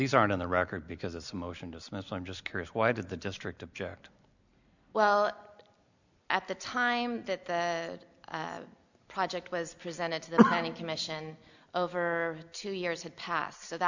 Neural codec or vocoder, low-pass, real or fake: none; 7.2 kHz; real